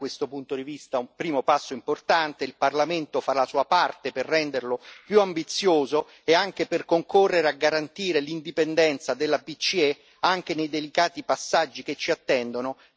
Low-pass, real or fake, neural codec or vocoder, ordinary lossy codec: none; real; none; none